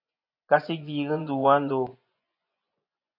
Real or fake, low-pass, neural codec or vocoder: fake; 5.4 kHz; vocoder, 24 kHz, 100 mel bands, Vocos